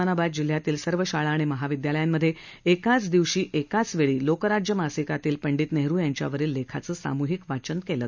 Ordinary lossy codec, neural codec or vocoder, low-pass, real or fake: none; none; 7.2 kHz; real